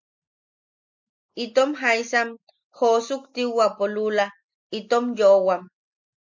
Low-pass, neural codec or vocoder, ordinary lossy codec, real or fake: 7.2 kHz; none; MP3, 48 kbps; real